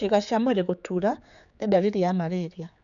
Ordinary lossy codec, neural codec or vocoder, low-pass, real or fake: none; codec, 16 kHz, 4 kbps, X-Codec, HuBERT features, trained on general audio; 7.2 kHz; fake